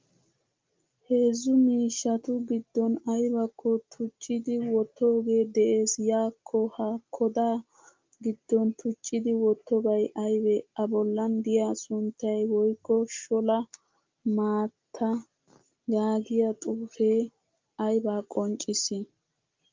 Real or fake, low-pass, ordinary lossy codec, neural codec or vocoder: real; 7.2 kHz; Opus, 24 kbps; none